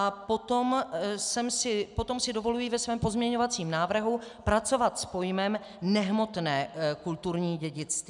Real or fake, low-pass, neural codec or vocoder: real; 10.8 kHz; none